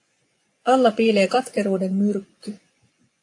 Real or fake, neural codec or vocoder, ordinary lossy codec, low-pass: fake; vocoder, 24 kHz, 100 mel bands, Vocos; AAC, 48 kbps; 10.8 kHz